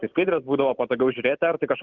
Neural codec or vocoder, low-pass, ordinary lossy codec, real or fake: none; 7.2 kHz; Opus, 24 kbps; real